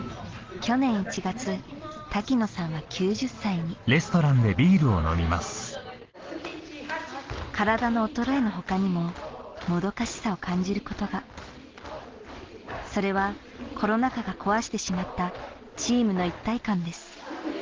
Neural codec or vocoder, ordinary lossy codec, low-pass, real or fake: none; Opus, 16 kbps; 7.2 kHz; real